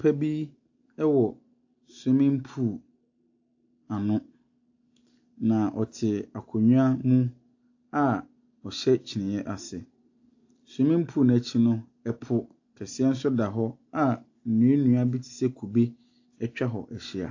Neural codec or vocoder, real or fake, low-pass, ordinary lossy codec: none; real; 7.2 kHz; AAC, 48 kbps